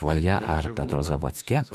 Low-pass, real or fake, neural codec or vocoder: 14.4 kHz; fake; autoencoder, 48 kHz, 32 numbers a frame, DAC-VAE, trained on Japanese speech